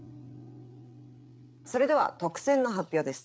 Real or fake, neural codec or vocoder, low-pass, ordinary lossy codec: fake; codec, 16 kHz, 8 kbps, FreqCodec, larger model; none; none